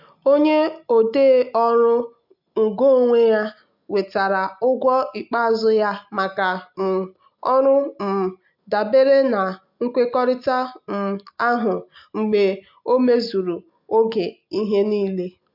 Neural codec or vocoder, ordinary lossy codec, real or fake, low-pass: none; MP3, 48 kbps; real; 5.4 kHz